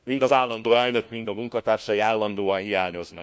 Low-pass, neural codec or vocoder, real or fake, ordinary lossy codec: none; codec, 16 kHz, 1 kbps, FunCodec, trained on LibriTTS, 50 frames a second; fake; none